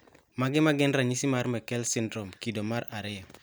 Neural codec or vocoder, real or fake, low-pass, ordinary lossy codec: none; real; none; none